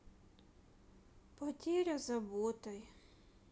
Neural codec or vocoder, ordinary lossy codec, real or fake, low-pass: none; none; real; none